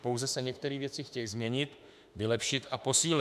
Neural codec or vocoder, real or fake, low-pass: autoencoder, 48 kHz, 32 numbers a frame, DAC-VAE, trained on Japanese speech; fake; 14.4 kHz